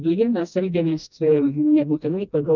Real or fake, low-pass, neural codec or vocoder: fake; 7.2 kHz; codec, 16 kHz, 1 kbps, FreqCodec, smaller model